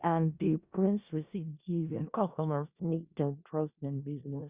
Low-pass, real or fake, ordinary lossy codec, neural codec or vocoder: 3.6 kHz; fake; Opus, 64 kbps; codec, 16 kHz in and 24 kHz out, 0.4 kbps, LongCat-Audio-Codec, four codebook decoder